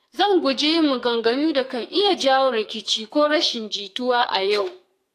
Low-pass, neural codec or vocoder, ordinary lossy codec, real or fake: 14.4 kHz; codec, 44.1 kHz, 2.6 kbps, SNAC; AAC, 64 kbps; fake